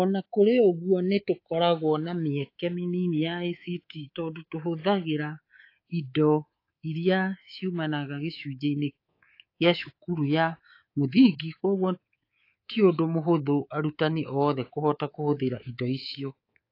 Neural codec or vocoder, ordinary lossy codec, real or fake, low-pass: codec, 24 kHz, 3.1 kbps, DualCodec; AAC, 32 kbps; fake; 5.4 kHz